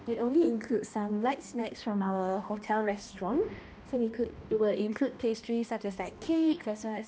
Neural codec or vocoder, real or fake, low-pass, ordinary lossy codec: codec, 16 kHz, 1 kbps, X-Codec, HuBERT features, trained on balanced general audio; fake; none; none